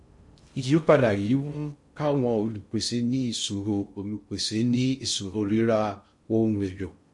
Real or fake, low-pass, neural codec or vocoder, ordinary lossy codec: fake; 10.8 kHz; codec, 16 kHz in and 24 kHz out, 0.6 kbps, FocalCodec, streaming, 2048 codes; MP3, 48 kbps